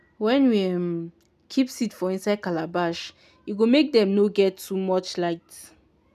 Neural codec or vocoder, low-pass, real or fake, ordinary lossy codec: none; 14.4 kHz; real; none